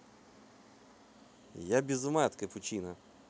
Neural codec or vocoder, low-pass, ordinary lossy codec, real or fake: none; none; none; real